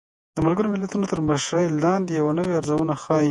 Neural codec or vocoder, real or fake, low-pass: vocoder, 48 kHz, 128 mel bands, Vocos; fake; 10.8 kHz